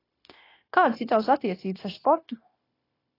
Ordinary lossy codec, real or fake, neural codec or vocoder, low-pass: AAC, 24 kbps; fake; codec, 16 kHz, 0.9 kbps, LongCat-Audio-Codec; 5.4 kHz